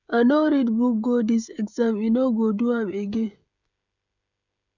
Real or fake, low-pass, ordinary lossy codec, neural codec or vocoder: fake; 7.2 kHz; none; codec, 16 kHz, 16 kbps, FreqCodec, smaller model